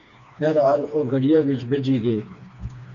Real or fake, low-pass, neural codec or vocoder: fake; 7.2 kHz; codec, 16 kHz, 2 kbps, FreqCodec, smaller model